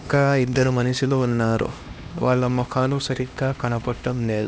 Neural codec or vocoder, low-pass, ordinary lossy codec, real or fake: codec, 16 kHz, 1 kbps, X-Codec, HuBERT features, trained on LibriSpeech; none; none; fake